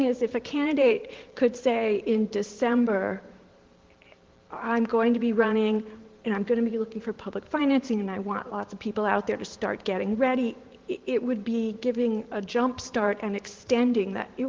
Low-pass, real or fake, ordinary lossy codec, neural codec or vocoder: 7.2 kHz; fake; Opus, 16 kbps; vocoder, 44.1 kHz, 128 mel bands every 512 samples, BigVGAN v2